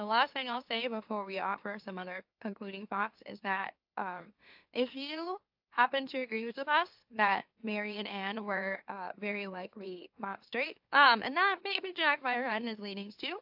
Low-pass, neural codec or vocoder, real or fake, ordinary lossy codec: 5.4 kHz; autoencoder, 44.1 kHz, a latent of 192 numbers a frame, MeloTTS; fake; AAC, 48 kbps